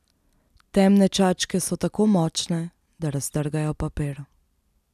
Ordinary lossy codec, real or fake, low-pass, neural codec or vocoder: none; real; 14.4 kHz; none